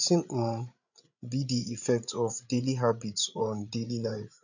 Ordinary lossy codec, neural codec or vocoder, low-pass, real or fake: none; codec, 16 kHz, 8 kbps, FreqCodec, larger model; 7.2 kHz; fake